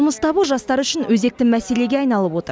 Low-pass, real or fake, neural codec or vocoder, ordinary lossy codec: none; real; none; none